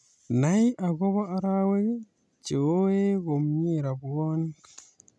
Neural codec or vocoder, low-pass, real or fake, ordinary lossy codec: none; none; real; none